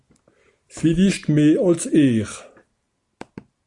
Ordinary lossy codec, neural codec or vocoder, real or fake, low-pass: Opus, 64 kbps; none; real; 10.8 kHz